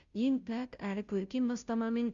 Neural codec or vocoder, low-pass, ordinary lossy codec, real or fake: codec, 16 kHz, 0.5 kbps, FunCodec, trained on Chinese and English, 25 frames a second; 7.2 kHz; Opus, 64 kbps; fake